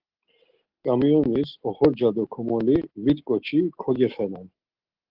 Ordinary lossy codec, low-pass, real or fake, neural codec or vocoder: Opus, 16 kbps; 5.4 kHz; real; none